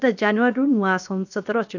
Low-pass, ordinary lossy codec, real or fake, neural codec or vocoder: 7.2 kHz; none; fake; codec, 16 kHz, 0.7 kbps, FocalCodec